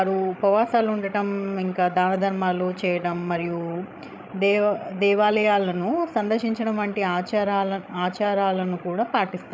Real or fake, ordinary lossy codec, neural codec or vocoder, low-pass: fake; none; codec, 16 kHz, 16 kbps, FreqCodec, larger model; none